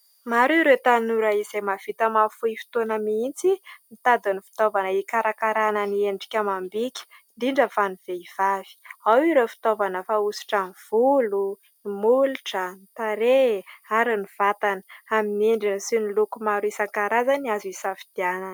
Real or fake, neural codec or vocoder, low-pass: real; none; 19.8 kHz